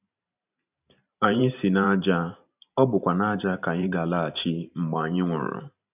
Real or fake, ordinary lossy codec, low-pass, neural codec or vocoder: fake; none; 3.6 kHz; vocoder, 44.1 kHz, 128 mel bands every 512 samples, BigVGAN v2